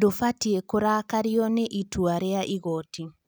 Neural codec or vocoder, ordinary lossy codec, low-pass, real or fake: none; none; none; real